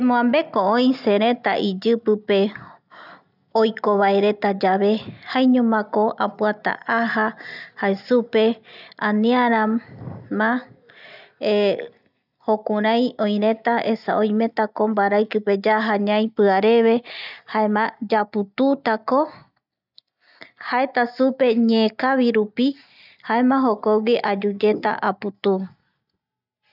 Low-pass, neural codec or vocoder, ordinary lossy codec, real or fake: 5.4 kHz; none; none; real